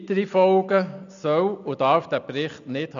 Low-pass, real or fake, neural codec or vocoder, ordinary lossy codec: 7.2 kHz; real; none; none